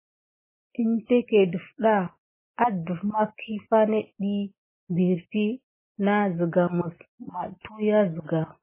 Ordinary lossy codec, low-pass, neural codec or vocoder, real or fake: MP3, 16 kbps; 3.6 kHz; none; real